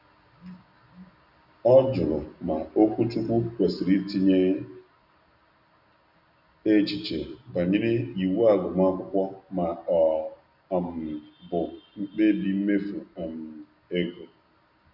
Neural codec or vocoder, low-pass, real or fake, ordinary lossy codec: none; 5.4 kHz; real; none